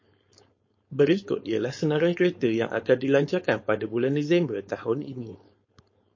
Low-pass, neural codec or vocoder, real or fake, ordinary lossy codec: 7.2 kHz; codec, 16 kHz, 4.8 kbps, FACodec; fake; MP3, 32 kbps